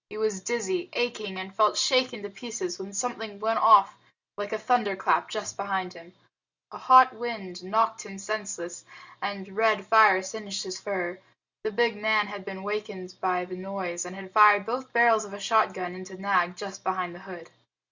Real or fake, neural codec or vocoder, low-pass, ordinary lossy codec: real; none; 7.2 kHz; Opus, 64 kbps